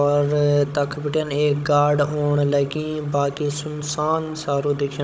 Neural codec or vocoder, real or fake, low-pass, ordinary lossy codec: codec, 16 kHz, 16 kbps, FunCodec, trained on Chinese and English, 50 frames a second; fake; none; none